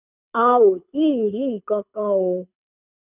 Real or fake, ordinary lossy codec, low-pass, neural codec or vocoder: fake; none; 3.6 kHz; codec, 24 kHz, 6 kbps, HILCodec